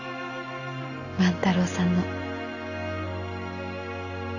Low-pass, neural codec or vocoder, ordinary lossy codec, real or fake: 7.2 kHz; none; none; real